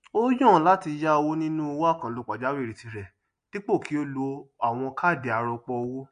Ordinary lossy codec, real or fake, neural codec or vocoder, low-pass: MP3, 48 kbps; real; none; 9.9 kHz